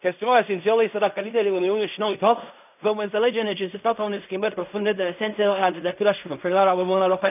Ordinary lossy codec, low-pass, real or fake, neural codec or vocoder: none; 3.6 kHz; fake; codec, 16 kHz in and 24 kHz out, 0.4 kbps, LongCat-Audio-Codec, fine tuned four codebook decoder